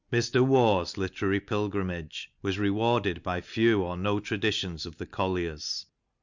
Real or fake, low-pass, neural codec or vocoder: real; 7.2 kHz; none